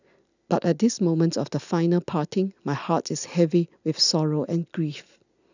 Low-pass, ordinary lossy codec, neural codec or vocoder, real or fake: 7.2 kHz; none; none; real